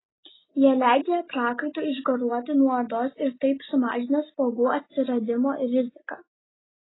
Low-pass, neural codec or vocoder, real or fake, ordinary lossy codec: 7.2 kHz; none; real; AAC, 16 kbps